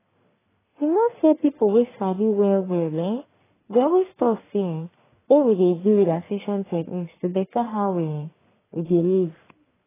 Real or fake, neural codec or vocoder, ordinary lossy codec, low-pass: fake; codec, 44.1 kHz, 1.7 kbps, Pupu-Codec; AAC, 16 kbps; 3.6 kHz